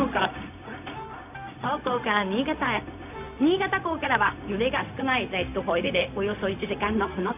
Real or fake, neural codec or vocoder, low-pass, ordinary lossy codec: fake; codec, 16 kHz, 0.4 kbps, LongCat-Audio-Codec; 3.6 kHz; none